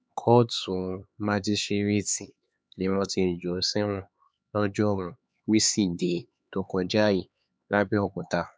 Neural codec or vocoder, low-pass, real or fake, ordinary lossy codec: codec, 16 kHz, 4 kbps, X-Codec, HuBERT features, trained on LibriSpeech; none; fake; none